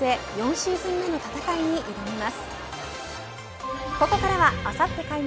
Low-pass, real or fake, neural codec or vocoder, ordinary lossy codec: none; real; none; none